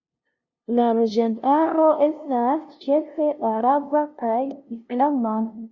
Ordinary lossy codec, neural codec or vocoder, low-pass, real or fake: Opus, 64 kbps; codec, 16 kHz, 0.5 kbps, FunCodec, trained on LibriTTS, 25 frames a second; 7.2 kHz; fake